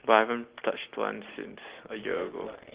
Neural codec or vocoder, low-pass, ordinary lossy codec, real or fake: none; 3.6 kHz; Opus, 16 kbps; real